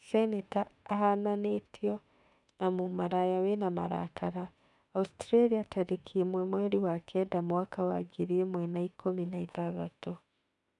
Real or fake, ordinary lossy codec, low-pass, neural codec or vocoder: fake; none; 10.8 kHz; autoencoder, 48 kHz, 32 numbers a frame, DAC-VAE, trained on Japanese speech